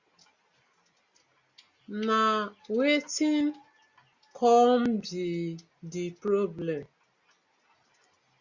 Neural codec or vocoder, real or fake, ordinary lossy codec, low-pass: none; real; Opus, 64 kbps; 7.2 kHz